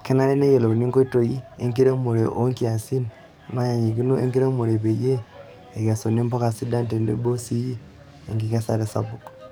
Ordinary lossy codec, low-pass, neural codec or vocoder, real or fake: none; none; codec, 44.1 kHz, 7.8 kbps, DAC; fake